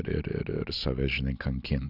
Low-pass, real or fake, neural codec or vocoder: 5.4 kHz; real; none